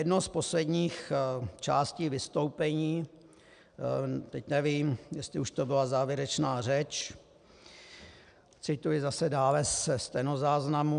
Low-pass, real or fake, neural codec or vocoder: 9.9 kHz; real; none